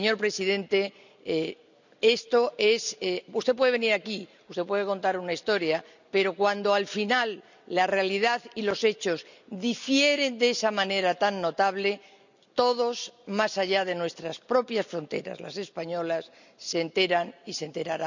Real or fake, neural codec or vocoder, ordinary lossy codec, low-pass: real; none; none; 7.2 kHz